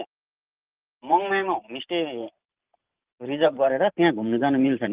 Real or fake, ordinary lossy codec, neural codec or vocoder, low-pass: fake; Opus, 32 kbps; vocoder, 44.1 kHz, 128 mel bands every 512 samples, BigVGAN v2; 3.6 kHz